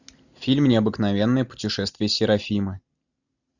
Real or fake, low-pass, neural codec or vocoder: real; 7.2 kHz; none